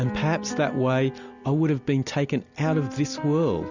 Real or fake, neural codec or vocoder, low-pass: real; none; 7.2 kHz